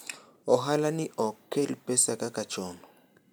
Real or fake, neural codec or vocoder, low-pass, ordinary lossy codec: real; none; none; none